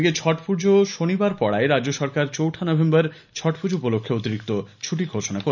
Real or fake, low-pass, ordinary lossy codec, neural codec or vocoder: real; 7.2 kHz; none; none